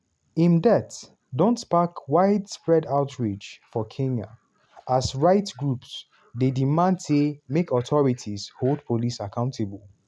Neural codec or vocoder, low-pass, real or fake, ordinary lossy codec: none; none; real; none